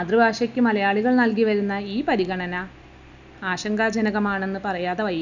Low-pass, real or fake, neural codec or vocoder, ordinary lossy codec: 7.2 kHz; real; none; none